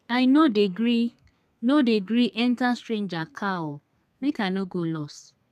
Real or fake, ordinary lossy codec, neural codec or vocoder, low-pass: fake; none; codec, 32 kHz, 1.9 kbps, SNAC; 14.4 kHz